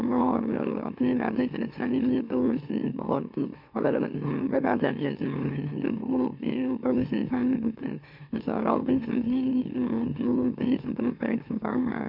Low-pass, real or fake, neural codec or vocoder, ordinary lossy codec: 5.4 kHz; fake; autoencoder, 44.1 kHz, a latent of 192 numbers a frame, MeloTTS; MP3, 48 kbps